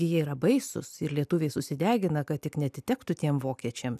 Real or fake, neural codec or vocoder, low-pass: real; none; 14.4 kHz